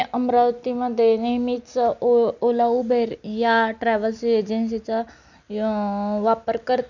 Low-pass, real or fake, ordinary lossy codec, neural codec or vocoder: 7.2 kHz; real; none; none